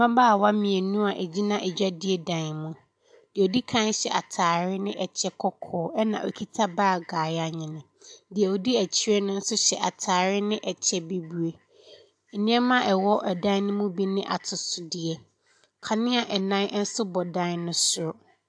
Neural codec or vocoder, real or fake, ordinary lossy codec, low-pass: none; real; AAC, 64 kbps; 9.9 kHz